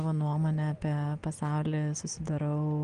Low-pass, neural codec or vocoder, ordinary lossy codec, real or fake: 9.9 kHz; none; Opus, 24 kbps; real